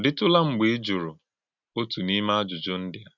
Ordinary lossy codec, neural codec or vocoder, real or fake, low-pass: none; none; real; 7.2 kHz